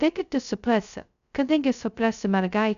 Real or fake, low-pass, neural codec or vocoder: fake; 7.2 kHz; codec, 16 kHz, 0.2 kbps, FocalCodec